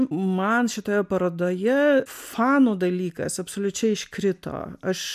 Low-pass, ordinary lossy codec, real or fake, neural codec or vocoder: 14.4 kHz; MP3, 96 kbps; real; none